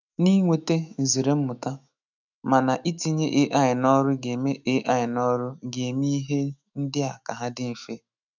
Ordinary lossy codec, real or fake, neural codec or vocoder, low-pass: none; fake; autoencoder, 48 kHz, 128 numbers a frame, DAC-VAE, trained on Japanese speech; 7.2 kHz